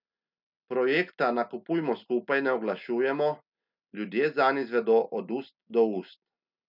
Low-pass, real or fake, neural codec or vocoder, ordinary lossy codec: 5.4 kHz; real; none; none